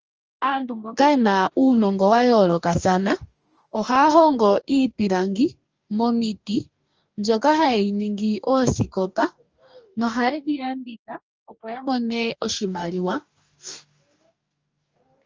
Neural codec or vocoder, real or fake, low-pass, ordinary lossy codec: codec, 44.1 kHz, 2.6 kbps, DAC; fake; 7.2 kHz; Opus, 24 kbps